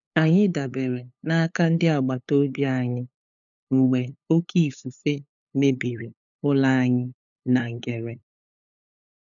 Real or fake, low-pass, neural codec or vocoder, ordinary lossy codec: fake; 7.2 kHz; codec, 16 kHz, 8 kbps, FunCodec, trained on LibriTTS, 25 frames a second; none